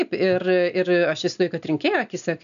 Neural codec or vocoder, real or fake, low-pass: none; real; 7.2 kHz